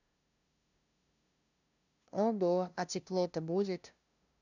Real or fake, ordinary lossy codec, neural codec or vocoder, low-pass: fake; none; codec, 16 kHz, 0.5 kbps, FunCodec, trained on LibriTTS, 25 frames a second; 7.2 kHz